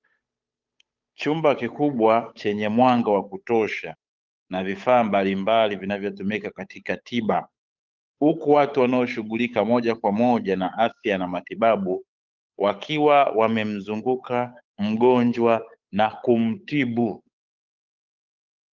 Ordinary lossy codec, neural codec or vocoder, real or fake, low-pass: Opus, 32 kbps; codec, 16 kHz, 8 kbps, FunCodec, trained on Chinese and English, 25 frames a second; fake; 7.2 kHz